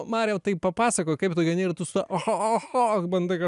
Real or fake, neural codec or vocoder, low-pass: real; none; 10.8 kHz